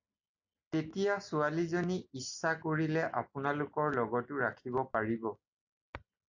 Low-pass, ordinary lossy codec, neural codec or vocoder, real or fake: 7.2 kHz; AAC, 48 kbps; none; real